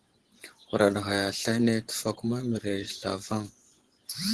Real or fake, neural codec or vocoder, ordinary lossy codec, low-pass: fake; vocoder, 24 kHz, 100 mel bands, Vocos; Opus, 16 kbps; 10.8 kHz